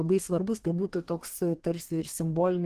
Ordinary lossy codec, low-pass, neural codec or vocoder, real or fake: Opus, 16 kbps; 14.4 kHz; codec, 32 kHz, 1.9 kbps, SNAC; fake